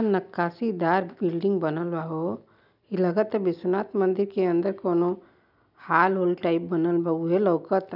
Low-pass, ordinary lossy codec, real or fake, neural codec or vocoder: 5.4 kHz; none; real; none